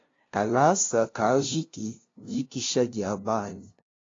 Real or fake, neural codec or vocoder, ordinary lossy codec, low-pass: fake; codec, 16 kHz, 0.5 kbps, FunCodec, trained on LibriTTS, 25 frames a second; AAC, 32 kbps; 7.2 kHz